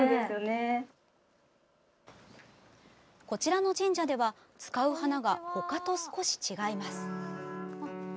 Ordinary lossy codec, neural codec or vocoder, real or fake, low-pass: none; none; real; none